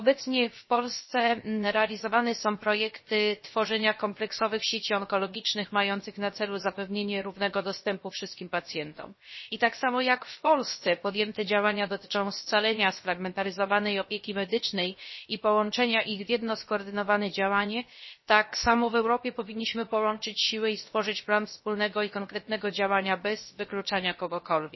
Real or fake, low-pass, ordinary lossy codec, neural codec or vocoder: fake; 7.2 kHz; MP3, 24 kbps; codec, 16 kHz, 0.7 kbps, FocalCodec